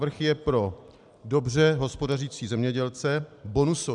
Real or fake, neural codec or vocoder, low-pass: real; none; 10.8 kHz